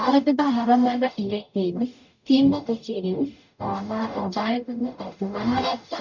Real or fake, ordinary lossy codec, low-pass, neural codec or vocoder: fake; none; 7.2 kHz; codec, 44.1 kHz, 0.9 kbps, DAC